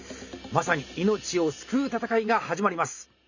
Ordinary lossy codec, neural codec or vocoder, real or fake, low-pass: none; vocoder, 44.1 kHz, 128 mel bands every 512 samples, BigVGAN v2; fake; 7.2 kHz